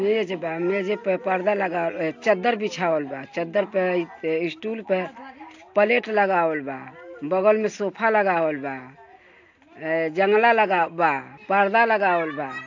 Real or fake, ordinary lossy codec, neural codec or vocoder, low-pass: real; AAC, 48 kbps; none; 7.2 kHz